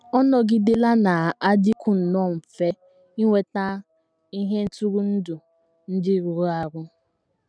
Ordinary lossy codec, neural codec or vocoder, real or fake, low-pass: none; none; real; 9.9 kHz